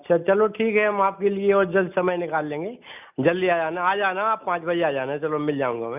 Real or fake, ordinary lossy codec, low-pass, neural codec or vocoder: real; none; 3.6 kHz; none